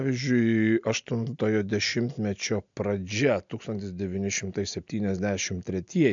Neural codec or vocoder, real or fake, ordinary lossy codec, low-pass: none; real; MP3, 64 kbps; 7.2 kHz